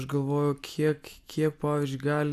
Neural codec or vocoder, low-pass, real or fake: none; 14.4 kHz; real